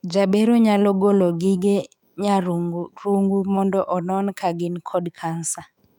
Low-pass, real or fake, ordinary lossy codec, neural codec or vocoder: 19.8 kHz; fake; none; autoencoder, 48 kHz, 128 numbers a frame, DAC-VAE, trained on Japanese speech